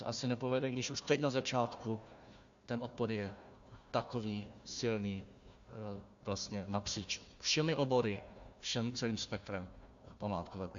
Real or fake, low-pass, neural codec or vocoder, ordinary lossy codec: fake; 7.2 kHz; codec, 16 kHz, 1 kbps, FunCodec, trained on Chinese and English, 50 frames a second; AAC, 64 kbps